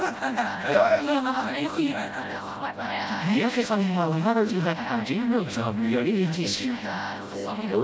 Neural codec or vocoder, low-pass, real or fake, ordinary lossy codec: codec, 16 kHz, 0.5 kbps, FreqCodec, smaller model; none; fake; none